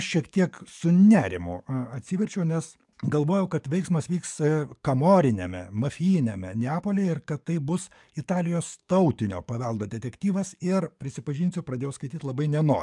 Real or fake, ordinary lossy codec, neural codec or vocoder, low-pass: fake; MP3, 96 kbps; codec, 44.1 kHz, 7.8 kbps, DAC; 10.8 kHz